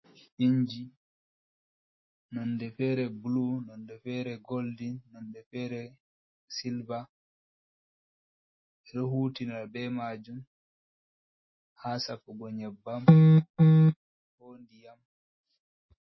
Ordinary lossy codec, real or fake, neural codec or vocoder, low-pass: MP3, 24 kbps; real; none; 7.2 kHz